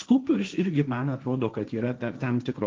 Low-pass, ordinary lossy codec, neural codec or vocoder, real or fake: 7.2 kHz; Opus, 32 kbps; codec, 16 kHz, 1.1 kbps, Voila-Tokenizer; fake